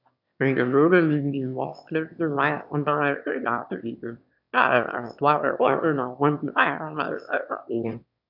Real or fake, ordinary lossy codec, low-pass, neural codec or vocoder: fake; none; 5.4 kHz; autoencoder, 22.05 kHz, a latent of 192 numbers a frame, VITS, trained on one speaker